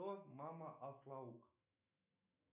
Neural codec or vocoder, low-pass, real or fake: autoencoder, 48 kHz, 128 numbers a frame, DAC-VAE, trained on Japanese speech; 3.6 kHz; fake